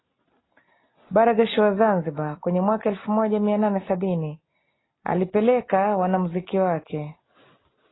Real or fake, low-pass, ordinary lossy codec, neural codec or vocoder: real; 7.2 kHz; AAC, 16 kbps; none